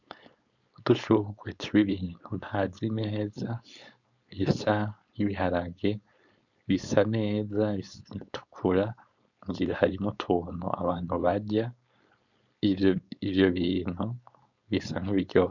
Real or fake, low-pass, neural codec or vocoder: fake; 7.2 kHz; codec, 16 kHz, 4.8 kbps, FACodec